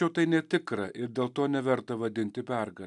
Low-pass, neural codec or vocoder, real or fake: 10.8 kHz; none; real